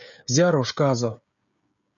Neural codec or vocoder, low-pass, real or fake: codec, 16 kHz, 16 kbps, FreqCodec, smaller model; 7.2 kHz; fake